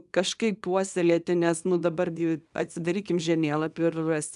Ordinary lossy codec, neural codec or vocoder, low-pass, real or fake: AAC, 96 kbps; codec, 24 kHz, 0.9 kbps, WavTokenizer, small release; 10.8 kHz; fake